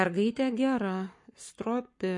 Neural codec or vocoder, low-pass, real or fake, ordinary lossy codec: codec, 44.1 kHz, 7.8 kbps, Pupu-Codec; 10.8 kHz; fake; MP3, 48 kbps